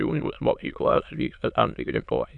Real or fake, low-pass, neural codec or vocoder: fake; 9.9 kHz; autoencoder, 22.05 kHz, a latent of 192 numbers a frame, VITS, trained on many speakers